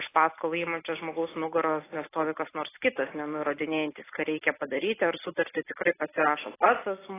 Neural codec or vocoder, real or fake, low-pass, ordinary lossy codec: none; real; 3.6 kHz; AAC, 16 kbps